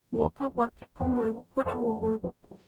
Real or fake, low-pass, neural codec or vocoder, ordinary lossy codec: fake; 19.8 kHz; codec, 44.1 kHz, 0.9 kbps, DAC; none